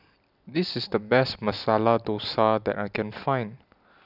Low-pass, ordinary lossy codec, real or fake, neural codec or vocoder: 5.4 kHz; none; real; none